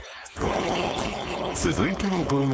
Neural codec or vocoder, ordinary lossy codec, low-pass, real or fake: codec, 16 kHz, 4.8 kbps, FACodec; none; none; fake